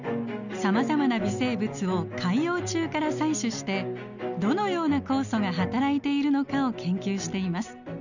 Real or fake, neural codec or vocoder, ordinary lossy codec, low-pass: real; none; none; 7.2 kHz